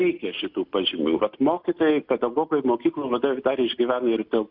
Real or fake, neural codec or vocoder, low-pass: real; none; 5.4 kHz